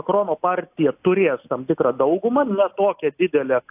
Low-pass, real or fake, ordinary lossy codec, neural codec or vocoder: 3.6 kHz; real; AAC, 32 kbps; none